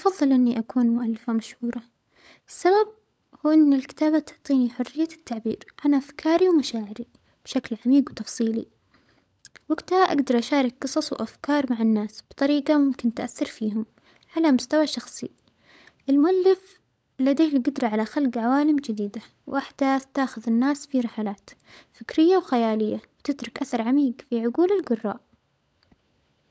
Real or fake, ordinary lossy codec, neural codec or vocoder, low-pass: fake; none; codec, 16 kHz, 16 kbps, FunCodec, trained on LibriTTS, 50 frames a second; none